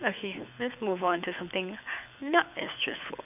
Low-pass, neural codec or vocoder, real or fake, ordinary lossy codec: 3.6 kHz; codec, 24 kHz, 6 kbps, HILCodec; fake; none